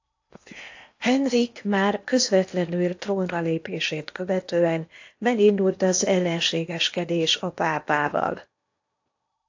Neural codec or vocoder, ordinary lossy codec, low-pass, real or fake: codec, 16 kHz in and 24 kHz out, 0.8 kbps, FocalCodec, streaming, 65536 codes; AAC, 48 kbps; 7.2 kHz; fake